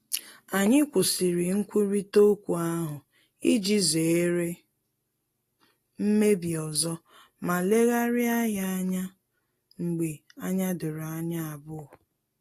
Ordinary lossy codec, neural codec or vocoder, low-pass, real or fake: AAC, 48 kbps; none; 14.4 kHz; real